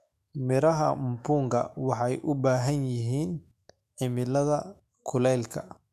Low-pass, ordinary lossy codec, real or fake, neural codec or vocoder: 14.4 kHz; MP3, 96 kbps; fake; autoencoder, 48 kHz, 128 numbers a frame, DAC-VAE, trained on Japanese speech